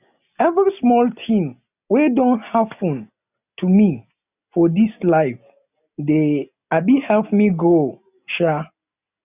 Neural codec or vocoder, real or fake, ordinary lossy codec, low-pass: none; real; none; 3.6 kHz